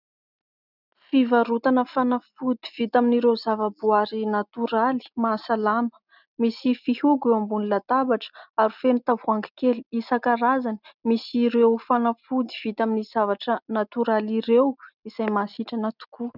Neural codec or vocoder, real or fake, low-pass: none; real; 5.4 kHz